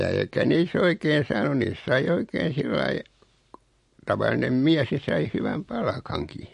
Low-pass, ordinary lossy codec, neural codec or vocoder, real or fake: 14.4 kHz; MP3, 48 kbps; none; real